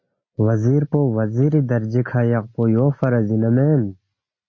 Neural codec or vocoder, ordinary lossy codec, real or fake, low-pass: none; MP3, 32 kbps; real; 7.2 kHz